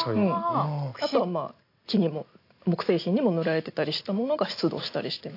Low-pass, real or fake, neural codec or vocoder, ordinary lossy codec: 5.4 kHz; real; none; AAC, 32 kbps